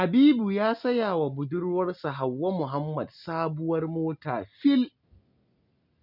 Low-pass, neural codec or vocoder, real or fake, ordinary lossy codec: 5.4 kHz; none; real; none